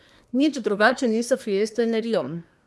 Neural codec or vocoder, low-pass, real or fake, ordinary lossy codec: codec, 24 kHz, 1 kbps, SNAC; none; fake; none